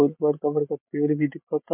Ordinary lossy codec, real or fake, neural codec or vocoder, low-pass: MP3, 32 kbps; real; none; 3.6 kHz